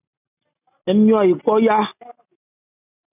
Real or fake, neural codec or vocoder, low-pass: real; none; 3.6 kHz